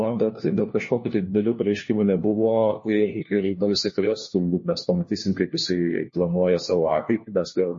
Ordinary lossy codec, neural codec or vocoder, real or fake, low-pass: MP3, 32 kbps; codec, 16 kHz, 1 kbps, FunCodec, trained on LibriTTS, 50 frames a second; fake; 7.2 kHz